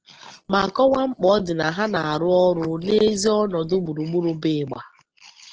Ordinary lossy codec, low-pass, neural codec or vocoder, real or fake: Opus, 16 kbps; 7.2 kHz; none; real